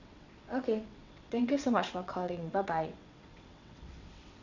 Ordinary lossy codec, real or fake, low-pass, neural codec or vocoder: none; fake; 7.2 kHz; vocoder, 22.05 kHz, 80 mel bands, WaveNeXt